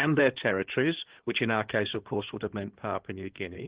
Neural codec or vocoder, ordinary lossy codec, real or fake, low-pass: codec, 16 kHz in and 24 kHz out, 2.2 kbps, FireRedTTS-2 codec; Opus, 16 kbps; fake; 3.6 kHz